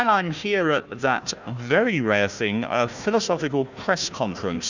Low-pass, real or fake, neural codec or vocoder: 7.2 kHz; fake; codec, 16 kHz, 1 kbps, FunCodec, trained on Chinese and English, 50 frames a second